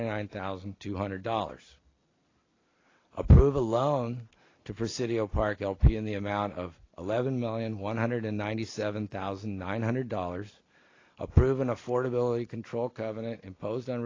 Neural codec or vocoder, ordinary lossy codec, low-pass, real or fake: none; AAC, 32 kbps; 7.2 kHz; real